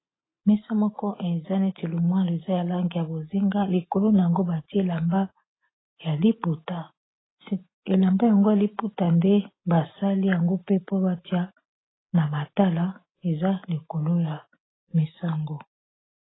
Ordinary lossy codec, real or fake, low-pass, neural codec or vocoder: AAC, 16 kbps; real; 7.2 kHz; none